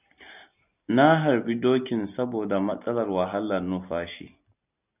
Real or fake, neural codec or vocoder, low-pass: real; none; 3.6 kHz